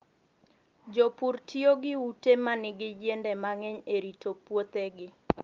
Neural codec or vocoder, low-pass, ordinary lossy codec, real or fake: none; 7.2 kHz; Opus, 32 kbps; real